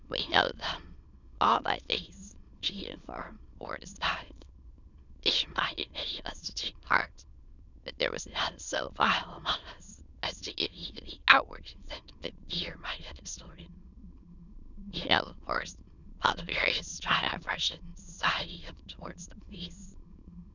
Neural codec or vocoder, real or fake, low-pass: autoencoder, 22.05 kHz, a latent of 192 numbers a frame, VITS, trained on many speakers; fake; 7.2 kHz